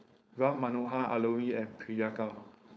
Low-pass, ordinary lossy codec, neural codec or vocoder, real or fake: none; none; codec, 16 kHz, 4.8 kbps, FACodec; fake